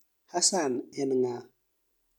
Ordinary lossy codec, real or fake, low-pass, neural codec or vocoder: none; real; 19.8 kHz; none